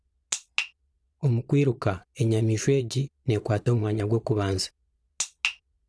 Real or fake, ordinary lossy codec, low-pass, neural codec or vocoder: fake; none; none; vocoder, 22.05 kHz, 80 mel bands, Vocos